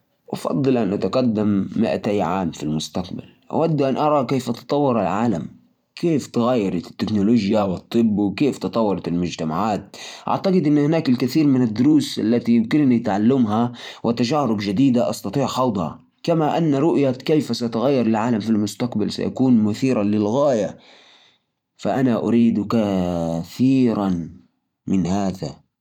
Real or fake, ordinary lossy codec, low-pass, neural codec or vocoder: fake; none; 19.8 kHz; vocoder, 44.1 kHz, 128 mel bands every 512 samples, BigVGAN v2